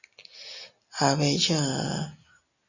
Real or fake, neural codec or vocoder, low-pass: real; none; 7.2 kHz